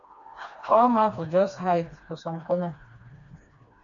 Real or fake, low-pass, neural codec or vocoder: fake; 7.2 kHz; codec, 16 kHz, 2 kbps, FreqCodec, smaller model